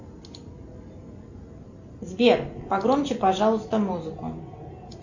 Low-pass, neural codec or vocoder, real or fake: 7.2 kHz; none; real